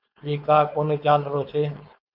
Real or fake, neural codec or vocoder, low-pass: fake; codec, 16 kHz, 4.8 kbps, FACodec; 5.4 kHz